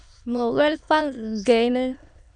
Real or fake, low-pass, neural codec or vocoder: fake; 9.9 kHz; autoencoder, 22.05 kHz, a latent of 192 numbers a frame, VITS, trained on many speakers